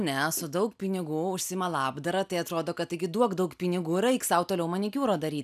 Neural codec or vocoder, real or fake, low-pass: none; real; 14.4 kHz